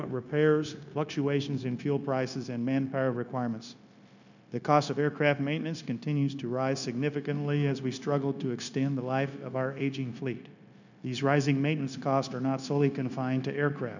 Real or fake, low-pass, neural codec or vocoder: fake; 7.2 kHz; codec, 16 kHz, 0.9 kbps, LongCat-Audio-Codec